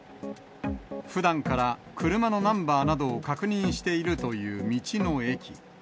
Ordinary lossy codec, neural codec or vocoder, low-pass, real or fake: none; none; none; real